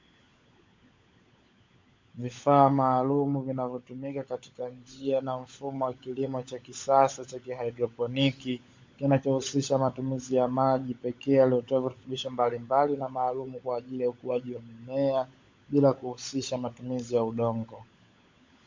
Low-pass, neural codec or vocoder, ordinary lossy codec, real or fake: 7.2 kHz; codec, 16 kHz, 16 kbps, FunCodec, trained on LibriTTS, 50 frames a second; MP3, 48 kbps; fake